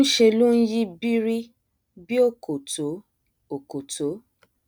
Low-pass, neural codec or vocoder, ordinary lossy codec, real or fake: none; none; none; real